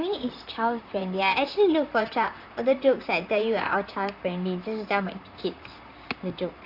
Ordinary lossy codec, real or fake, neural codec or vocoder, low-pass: Opus, 64 kbps; fake; vocoder, 44.1 kHz, 128 mel bands, Pupu-Vocoder; 5.4 kHz